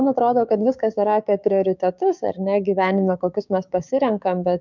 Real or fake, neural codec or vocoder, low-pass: fake; codec, 16 kHz, 6 kbps, DAC; 7.2 kHz